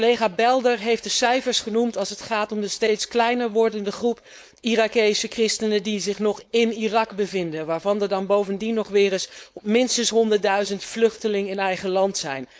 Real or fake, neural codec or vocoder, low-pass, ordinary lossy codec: fake; codec, 16 kHz, 4.8 kbps, FACodec; none; none